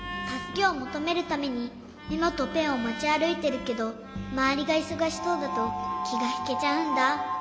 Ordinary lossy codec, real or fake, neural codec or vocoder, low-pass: none; real; none; none